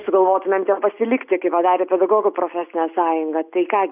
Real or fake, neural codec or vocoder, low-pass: real; none; 3.6 kHz